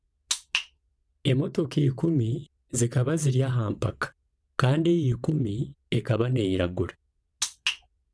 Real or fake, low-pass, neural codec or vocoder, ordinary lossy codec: fake; none; vocoder, 22.05 kHz, 80 mel bands, WaveNeXt; none